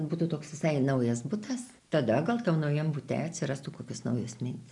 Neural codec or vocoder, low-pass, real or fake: none; 10.8 kHz; real